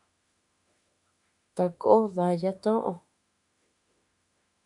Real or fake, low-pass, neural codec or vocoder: fake; 10.8 kHz; autoencoder, 48 kHz, 32 numbers a frame, DAC-VAE, trained on Japanese speech